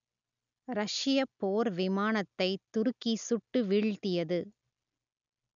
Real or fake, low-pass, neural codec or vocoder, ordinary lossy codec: real; 7.2 kHz; none; none